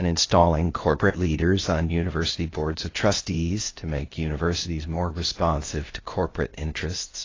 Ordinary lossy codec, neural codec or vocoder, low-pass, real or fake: AAC, 32 kbps; codec, 16 kHz, 0.8 kbps, ZipCodec; 7.2 kHz; fake